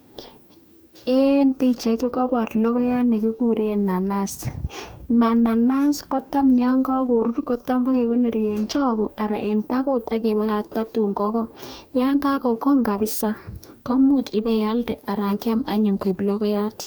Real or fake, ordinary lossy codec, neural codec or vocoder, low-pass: fake; none; codec, 44.1 kHz, 2.6 kbps, DAC; none